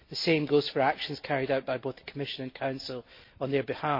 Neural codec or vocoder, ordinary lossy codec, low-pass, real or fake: none; MP3, 32 kbps; 5.4 kHz; real